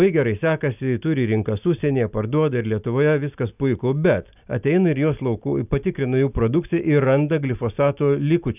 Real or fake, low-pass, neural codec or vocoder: real; 3.6 kHz; none